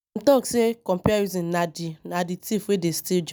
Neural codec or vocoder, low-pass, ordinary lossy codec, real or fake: none; none; none; real